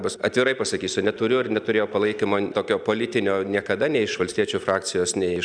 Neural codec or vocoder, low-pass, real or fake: none; 9.9 kHz; real